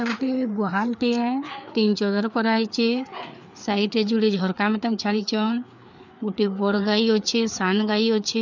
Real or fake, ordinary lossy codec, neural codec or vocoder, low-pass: fake; none; codec, 16 kHz, 4 kbps, FreqCodec, larger model; 7.2 kHz